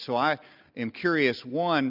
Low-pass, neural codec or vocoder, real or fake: 5.4 kHz; none; real